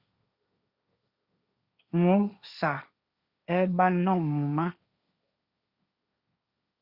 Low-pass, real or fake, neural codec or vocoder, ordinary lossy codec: 5.4 kHz; fake; codec, 16 kHz, 1.1 kbps, Voila-Tokenizer; Opus, 64 kbps